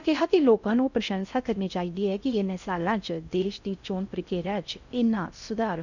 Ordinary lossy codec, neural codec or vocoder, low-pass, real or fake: none; codec, 16 kHz in and 24 kHz out, 0.6 kbps, FocalCodec, streaming, 4096 codes; 7.2 kHz; fake